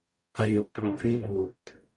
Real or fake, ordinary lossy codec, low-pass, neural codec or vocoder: fake; MP3, 48 kbps; 10.8 kHz; codec, 44.1 kHz, 0.9 kbps, DAC